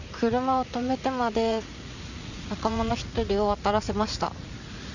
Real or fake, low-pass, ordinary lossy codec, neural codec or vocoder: fake; 7.2 kHz; none; vocoder, 44.1 kHz, 80 mel bands, Vocos